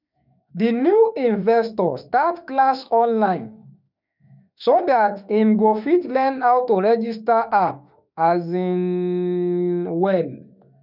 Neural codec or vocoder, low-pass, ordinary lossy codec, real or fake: autoencoder, 48 kHz, 32 numbers a frame, DAC-VAE, trained on Japanese speech; 5.4 kHz; none; fake